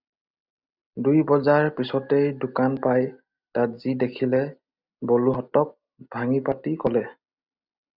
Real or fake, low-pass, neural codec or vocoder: real; 5.4 kHz; none